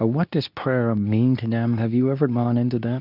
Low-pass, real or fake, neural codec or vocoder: 5.4 kHz; fake; codec, 24 kHz, 0.9 kbps, WavTokenizer, medium speech release version 2